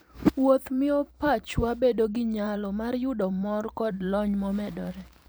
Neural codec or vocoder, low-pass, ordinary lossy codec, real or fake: vocoder, 44.1 kHz, 128 mel bands every 512 samples, BigVGAN v2; none; none; fake